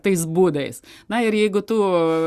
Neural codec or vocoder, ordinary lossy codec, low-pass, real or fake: none; Opus, 64 kbps; 14.4 kHz; real